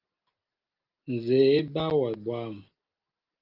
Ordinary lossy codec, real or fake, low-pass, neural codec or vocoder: Opus, 32 kbps; real; 5.4 kHz; none